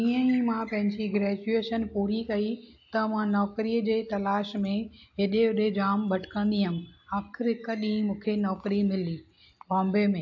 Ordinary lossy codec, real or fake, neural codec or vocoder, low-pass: AAC, 48 kbps; real; none; 7.2 kHz